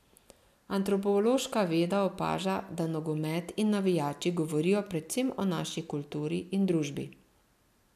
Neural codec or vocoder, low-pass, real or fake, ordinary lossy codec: none; 14.4 kHz; real; none